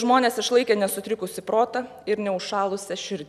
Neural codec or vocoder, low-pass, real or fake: none; 14.4 kHz; real